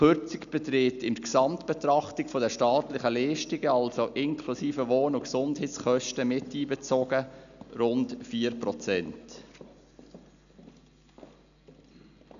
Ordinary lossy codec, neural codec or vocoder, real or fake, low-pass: none; none; real; 7.2 kHz